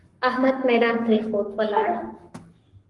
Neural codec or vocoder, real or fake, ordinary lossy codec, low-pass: codec, 44.1 kHz, 7.8 kbps, Pupu-Codec; fake; Opus, 24 kbps; 10.8 kHz